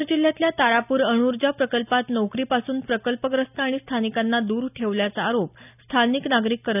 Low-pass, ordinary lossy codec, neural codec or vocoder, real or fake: 3.6 kHz; none; none; real